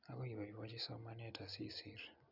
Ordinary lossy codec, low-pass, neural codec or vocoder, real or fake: none; 5.4 kHz; none; real